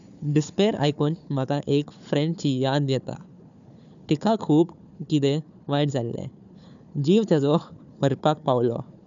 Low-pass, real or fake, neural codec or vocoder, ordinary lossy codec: 7.2 kHz; fake; codec, 16 kHz, 4 kbps, FunCodec, trained on Chinese and English, 50 frames a second; MP3, 96 kbps